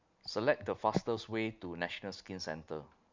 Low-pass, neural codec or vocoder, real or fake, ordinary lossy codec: 7.2 kHz; none; real; MP3, 64 kbps